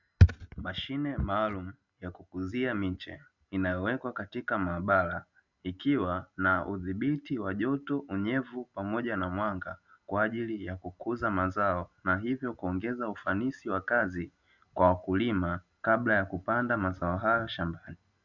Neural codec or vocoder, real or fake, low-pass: none; real; 7.2 kHz